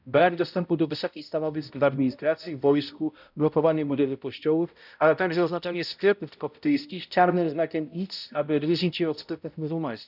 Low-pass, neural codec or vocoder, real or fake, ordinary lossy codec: 5.4 kHz; codec, 16 kHz, 0.5 kbps, X-Codec, HuBERT features, trained on balanced general audio; fake; none